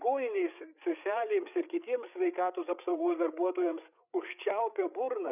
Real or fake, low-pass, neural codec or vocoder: fake; 3.6 kHz; codec, 16 kHz, 8 kbps, FreqCodec, larger model